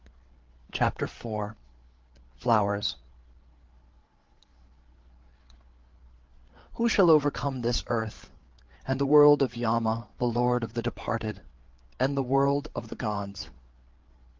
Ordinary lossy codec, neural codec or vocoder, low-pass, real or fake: Opus, 16 kbps; codec, 16 kHz, 8 kbps, FreqCodec, larger model; 7.2 kHz; fake